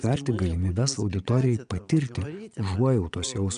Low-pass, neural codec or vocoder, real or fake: 9.9 kHz; vocoder, 22.05 kHz, 80 mel bands, Vocos; fake